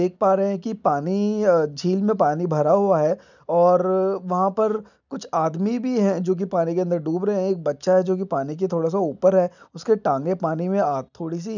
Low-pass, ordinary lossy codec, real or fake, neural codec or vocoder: 7.2 kHz; none; real; none